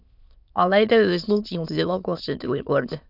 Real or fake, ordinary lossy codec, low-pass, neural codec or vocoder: fake; none; 5.4 kHz; autoencoder, 22.05 kHz, a latent of 192 numbers a frame, VITS, trained on many speakers